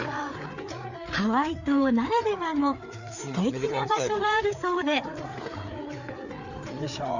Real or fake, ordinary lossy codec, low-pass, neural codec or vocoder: fake; none; 7.2 kHz; codec, 16 kHz, 4 kbps, FreqCodec, larger model